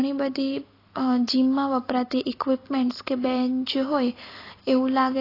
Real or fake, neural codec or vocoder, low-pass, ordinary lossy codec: real; none; 5.4 kHz; AAC, 24 kbps